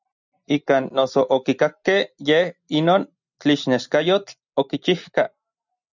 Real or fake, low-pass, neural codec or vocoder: real; 7.2 kHz; none